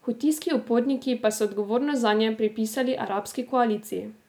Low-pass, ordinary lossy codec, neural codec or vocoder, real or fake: none; none; none; real